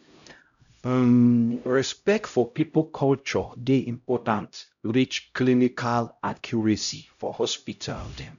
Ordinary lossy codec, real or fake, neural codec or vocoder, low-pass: none; fake; codec, 16 kHz, 0.5 kbps, X-Codec, HuBERT features, trained on LibriSpeech; 7.2 kHz